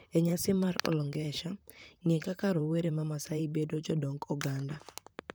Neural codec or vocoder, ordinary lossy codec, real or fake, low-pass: vocoder, 44.1 kHz, 128 mel bands, Pupu-Vocoder; none; fake; none